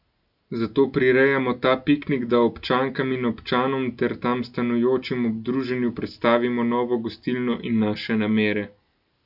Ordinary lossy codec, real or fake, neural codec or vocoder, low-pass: none; real; none; 5.4 kHz